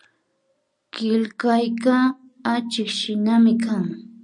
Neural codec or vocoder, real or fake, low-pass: none; real; 10.8 kHz